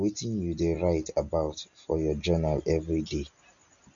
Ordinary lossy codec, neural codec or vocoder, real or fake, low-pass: none; none; real; 7.2 kHz